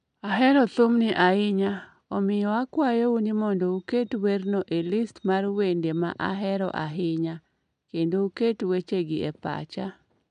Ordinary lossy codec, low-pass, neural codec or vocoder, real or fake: none; 9.9 kHz; none; real